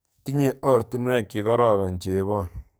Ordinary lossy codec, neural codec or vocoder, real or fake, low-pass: none; codec, 44.1 kHz, 2.6 kbps, SNAC; fake; none